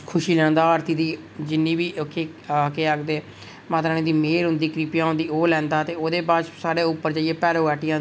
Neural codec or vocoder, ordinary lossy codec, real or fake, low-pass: none; none; real; none